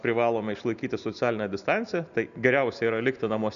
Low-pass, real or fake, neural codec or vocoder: 7.2 kHz; real; none